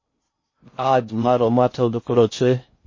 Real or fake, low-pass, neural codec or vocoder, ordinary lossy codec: fake; 7.2 kHz; codec, 16 kHz in and 24 kHz out, 0.6 kbps, FocalCodec, streaming, 4096 codes; MP3, 32 kbps